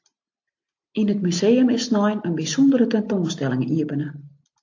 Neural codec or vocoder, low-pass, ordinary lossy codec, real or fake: none; 7.2 kHz; AAC, 48 kbps; real